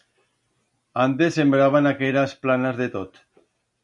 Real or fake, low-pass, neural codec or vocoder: real; 10.8 kHz; none